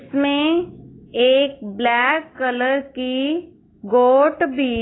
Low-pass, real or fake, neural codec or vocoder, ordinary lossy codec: 7.2 kHz; fake; autoencoder, 48 kHz, 128 numbers a frame, DAC-VAE, trained on Japanese speech; AAC, 16 kbps